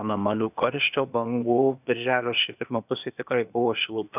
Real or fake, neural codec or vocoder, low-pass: fake; codec, 16 kHz, 0.8 kbps, ZipCodec; 3.6 kHz